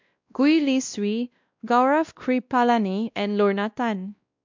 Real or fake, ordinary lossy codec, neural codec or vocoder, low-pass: fake; MP3, 64 kbps; codec, 16 kHz, 1 kbps, X-Codec, WavLM features, trained on Multilingual LibriSpeech; 7.2 kHz